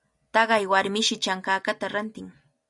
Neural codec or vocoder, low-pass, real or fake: none; 10.8 kHz; real